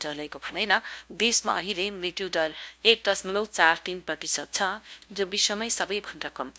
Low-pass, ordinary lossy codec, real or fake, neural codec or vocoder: none; none; fake; codec, 16 kHz, 0.5 kbps, FunCodec, trained on LibriTTS, 25 frames a second